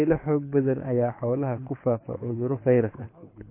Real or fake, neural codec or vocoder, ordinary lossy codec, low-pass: fake; codec, 24 kHz, 6 kbps, HILCodec; MP3, 24 kbps; 3.6 kHz